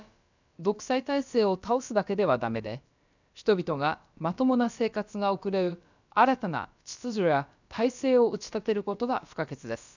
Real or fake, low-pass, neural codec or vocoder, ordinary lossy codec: fake; 7.2 kHz; codec, 16 kHz, about 1 kbps, DyCAST, with the encoder's durations; none